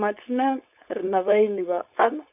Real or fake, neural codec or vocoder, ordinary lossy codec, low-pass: fake; codec, 16 kHz, 4.8 kbps, FACodec; none; 3.6 kHz